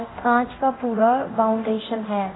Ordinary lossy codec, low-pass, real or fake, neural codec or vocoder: AAC, 16 kbps; 7.2 kHz; fake; codec, 24 kHz, 0.9 kbps, DualCodec